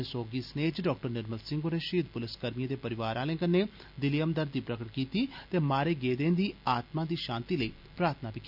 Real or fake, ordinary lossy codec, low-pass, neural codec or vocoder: real; none; 5.4 kHz; none